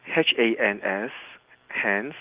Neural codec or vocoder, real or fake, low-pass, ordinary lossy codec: none; real; 3.6 kHz; Opus, 32 kbps